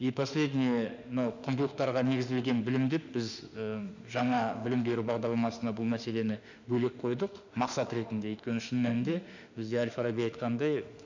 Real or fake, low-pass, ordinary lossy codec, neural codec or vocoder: fake; 7.2 kHz; none; autoencoder, 48 kHz, 32 numbers a frame, DAC-VAE, trained on Japanese speech